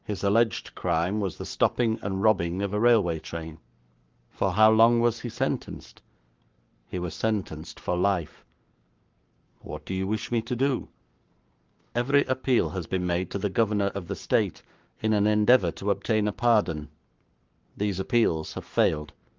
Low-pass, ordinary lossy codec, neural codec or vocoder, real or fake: 7.2 kHz; Opus, 24 kbps; codec, 44.1 kHz, 7.8 kbps, Pupu-Codec; fake